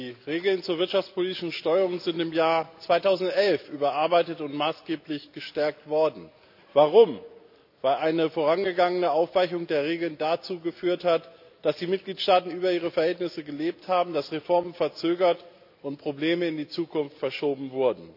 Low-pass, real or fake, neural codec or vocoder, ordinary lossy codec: 5.4 kHz; real; none; AAC, 48 kbps